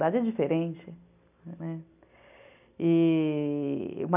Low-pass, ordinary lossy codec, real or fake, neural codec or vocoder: 3.6 kHz; none; real; none